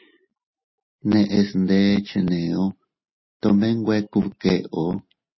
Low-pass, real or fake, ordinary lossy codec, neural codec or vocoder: 7.2 kHz; real; MP3, 24 kbps; none